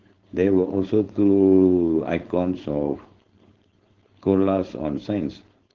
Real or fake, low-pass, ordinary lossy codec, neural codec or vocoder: fake; 7.2 kHz; Opus, 16 kbps; codec, 16 kHz, 4.8 kbps, FACodec